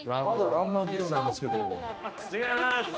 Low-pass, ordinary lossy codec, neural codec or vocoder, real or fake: none; none; codec, 16 kHz, 1 kbps, X-Codec, HuBERT features, trained on general audio; fake